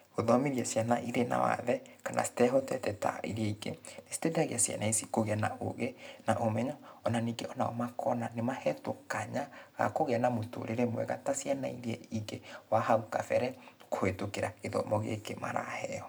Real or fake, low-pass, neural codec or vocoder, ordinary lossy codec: real; none; none; none